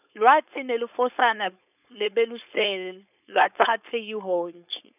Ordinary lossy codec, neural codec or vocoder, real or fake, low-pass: none; codec, 16 kHz, 4.8 kbps, FACodec; fake; 3.6 kHz